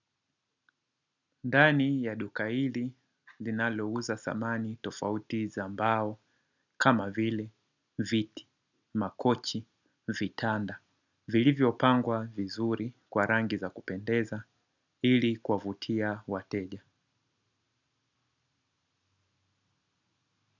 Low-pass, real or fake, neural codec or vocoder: 7.2 kHz; real; none